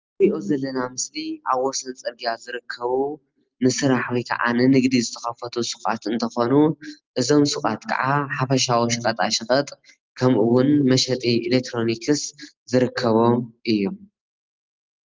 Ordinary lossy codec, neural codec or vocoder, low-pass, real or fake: Opus, 24 kbps; none; 7.2 kHz; real